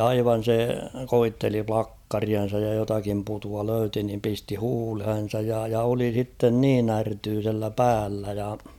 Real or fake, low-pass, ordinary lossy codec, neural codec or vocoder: real; 19.8 kHz; none; none